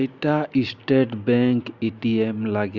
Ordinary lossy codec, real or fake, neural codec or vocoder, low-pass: Opus, 64 kbps; real; none; 7.2 kHz